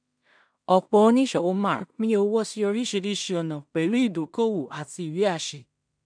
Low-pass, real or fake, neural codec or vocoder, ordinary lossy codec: 9.9 kHz; fake; codec, 16 kHz in and 24 kHz out, 0.4 kbps, LongCat-Audio-Codec, two codebook decoder; none